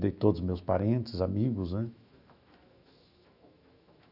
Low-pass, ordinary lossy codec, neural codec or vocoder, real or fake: 5.4 kHz; none; autoencoder, 48 kHz, 128 numbers a frame, DAC-VAE, trained on Japanese speech; fake